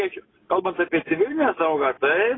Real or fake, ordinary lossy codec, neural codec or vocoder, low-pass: real; AAC, 16 kbps; none; 7.2 kHz